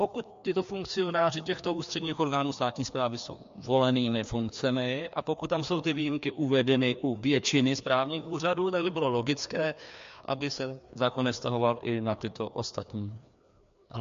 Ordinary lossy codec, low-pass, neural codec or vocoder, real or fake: MP3, 48 kbps; 7.2 kHz; codec, 16 kHz, 2 kbps, FreqCodec, larger model; fake